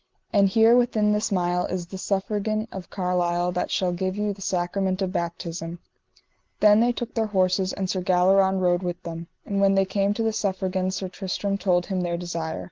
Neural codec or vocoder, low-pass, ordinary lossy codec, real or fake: none; 7.2 kHz; Opus, 16 kbps; real